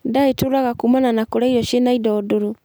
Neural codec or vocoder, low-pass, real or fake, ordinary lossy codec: none; none; real; none